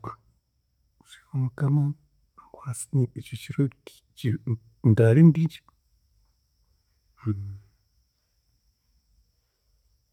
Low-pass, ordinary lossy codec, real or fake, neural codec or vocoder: 19.8 kHz; none; real; none